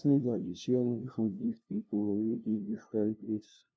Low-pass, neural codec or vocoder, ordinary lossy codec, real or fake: none; codec, 16 kHz, 0.5 kbps, FunCodec, trained on LibriTTS, 25 frames a second; none; fake